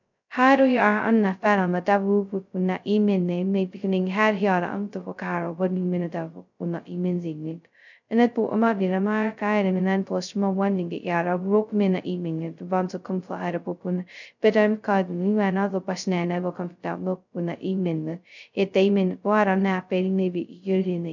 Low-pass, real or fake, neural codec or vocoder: 7.2 kHz; fake; codec, 16 kHz, 0.2 kbps, FocalCodec